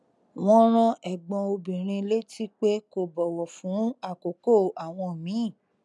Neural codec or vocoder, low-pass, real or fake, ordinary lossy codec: none; none; real; none